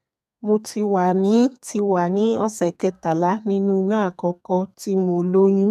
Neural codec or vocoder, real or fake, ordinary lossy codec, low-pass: codec, 32 kHz, 1.9 kbps, SNAC; fake; none; 14.4 kHz